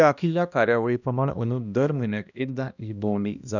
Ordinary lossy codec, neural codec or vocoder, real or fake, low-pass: none; codec, 16 kHz, 1 kbps, X-Codec, HuBERT features, trained on balanced general audio; fake; 7.2 kHz